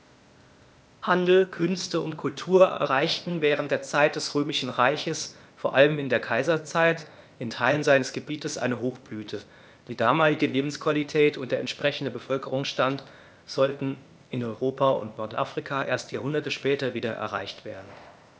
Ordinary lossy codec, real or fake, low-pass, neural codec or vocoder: none; fake; none; codec, 16 kHz, 0.8 kbps, ZipCodec